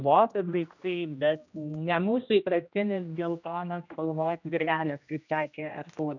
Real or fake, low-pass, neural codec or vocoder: fake; 7.2 kHz; codec, 16 kHz, 1 kbps, X-Codec, HuBERT features, trained on general audio